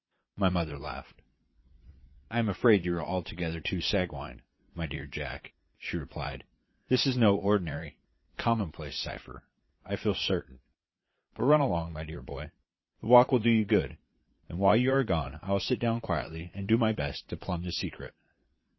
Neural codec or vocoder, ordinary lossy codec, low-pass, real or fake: vocoder, 22.05 kHz, 80 mel bands, Vocos; MP3, 24 kbps; 7.2 kHz; fake